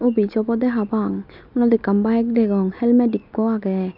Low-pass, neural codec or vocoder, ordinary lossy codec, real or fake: 5.4 kHz; none; none; real